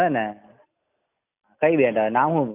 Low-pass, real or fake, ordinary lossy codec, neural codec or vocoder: 3.6 kHz; real; none; none